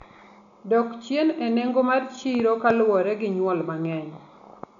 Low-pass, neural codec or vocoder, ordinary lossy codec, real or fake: 7.2 kHz; none; none; real